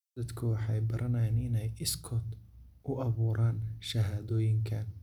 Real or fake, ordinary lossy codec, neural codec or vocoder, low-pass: real; none; none; 19.8 kHz